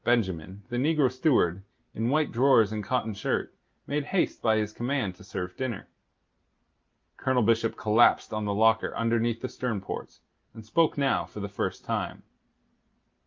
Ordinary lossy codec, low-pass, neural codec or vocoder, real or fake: Opus, 32 kbps; 7.2 kHz; none; real